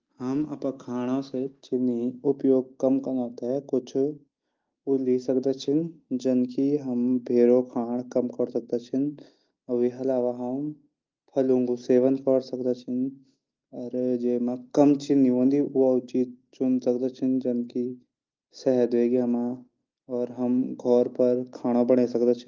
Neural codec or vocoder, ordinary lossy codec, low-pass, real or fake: none; Opus, 32 kbps; 7.2 kHz; real